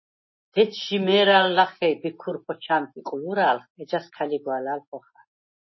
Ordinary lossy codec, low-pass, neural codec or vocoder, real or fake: MP3, 24 kbps; 7.2 kHz; none; real